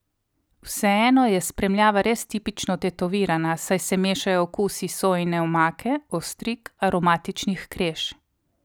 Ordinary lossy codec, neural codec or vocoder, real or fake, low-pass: none; none; real; none